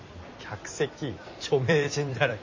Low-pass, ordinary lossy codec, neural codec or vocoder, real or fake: 7.2 kHz; MP3, 32 kbps; vocoder, 44.1 kHz, 128 mel bands every 256 samples, BigVGAN v2; fake